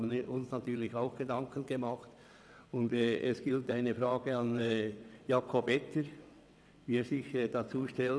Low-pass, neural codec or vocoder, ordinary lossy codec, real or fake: none; vocoder, 22.05 kHz, 80 mel bands, WaveNeXt; none; fake